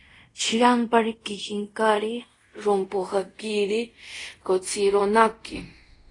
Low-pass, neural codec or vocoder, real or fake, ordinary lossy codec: 10.8 kHz; codec, 24 kHz, 0.5 kbps, DualCodec; fake; AAC, 32 kbps